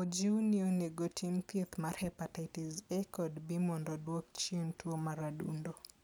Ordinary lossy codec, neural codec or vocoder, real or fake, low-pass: none; none; real; none